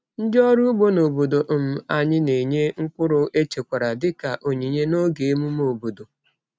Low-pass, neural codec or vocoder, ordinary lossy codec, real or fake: none; none; none; real